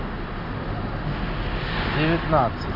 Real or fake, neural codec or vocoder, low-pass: real; none; 5.4 kHz